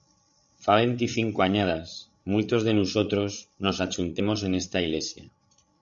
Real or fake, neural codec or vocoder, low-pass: fake; codec, 16 kHz, 8 kbps, FreqCodec, larger model; 7.2 kHz